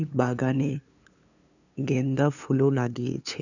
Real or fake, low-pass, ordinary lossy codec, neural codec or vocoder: fake; 7.2 kHz; none; codec, 16 kHz, 2 kbps, FunCodec, trained on LibriTTS, 25 frames a second